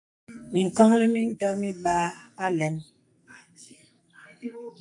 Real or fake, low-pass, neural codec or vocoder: fake; 10.8 kHz; codec, 44.1 kHz, 2.6 kbps, SNAC